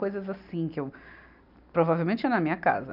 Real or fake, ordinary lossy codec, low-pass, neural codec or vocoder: real; none; 5.4 kHz; none